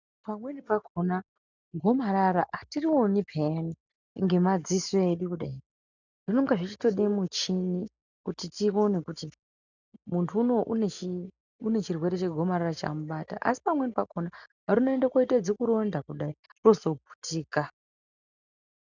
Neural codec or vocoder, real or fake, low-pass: none; real; 7.2 kHz